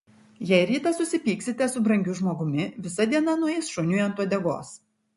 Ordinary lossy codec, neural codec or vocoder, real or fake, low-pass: MP3, 48 kbps; none; real; 14.4 kHz